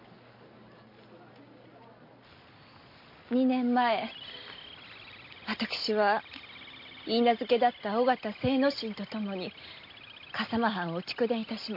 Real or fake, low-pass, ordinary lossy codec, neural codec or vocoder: real; 5.4 kHz; MP3, 32 kbps; none